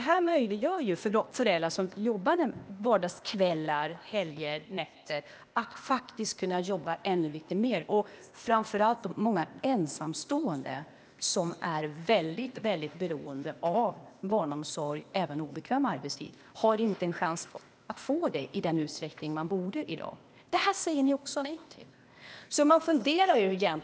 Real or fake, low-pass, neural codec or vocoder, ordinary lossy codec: fake; none; codec, 16 kHz, 0.8 kbps, ZipCodec; none